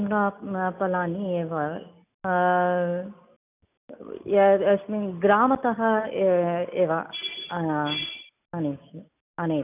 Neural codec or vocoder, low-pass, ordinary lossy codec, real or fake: none; 3.6 kHz; none; real